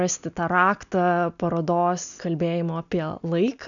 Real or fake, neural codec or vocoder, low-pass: real; none; 7.2 kHz